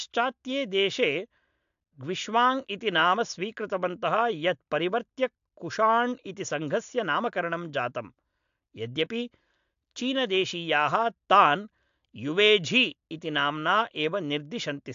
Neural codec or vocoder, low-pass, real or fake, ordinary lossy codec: none; 7.2 kHz; real; AAC, 64 kbps